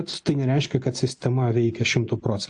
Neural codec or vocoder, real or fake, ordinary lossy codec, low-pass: none; real; AAC, 64 kbps; 9.9 kHz